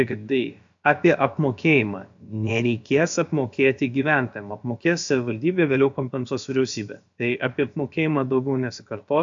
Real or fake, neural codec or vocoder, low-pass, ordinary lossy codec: fake; codec, 16 kHz, about 1 kbps, DyCAST, with the encoder's durations; 7.2 kHz; AAC, 64 kbps